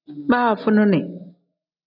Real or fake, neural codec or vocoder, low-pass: real; none; 5.4 kHz